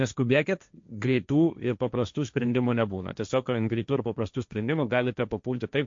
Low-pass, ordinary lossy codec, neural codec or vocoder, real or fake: 7.2 kHz; MP3, 48 kbps; codec, 16 kHz, 1.1 kbps, Voila-Tokenizer; fake